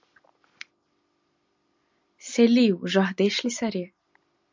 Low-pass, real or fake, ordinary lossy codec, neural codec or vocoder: 7.2 kHz; real; MP3, 64 kbps; none